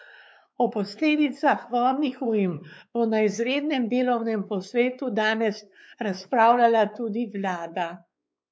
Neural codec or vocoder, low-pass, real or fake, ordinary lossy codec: codec, 16 kHz, 4 kbps, X-Codec, WavLM features, trained on Multilingual LibriSpeech; none; fake; none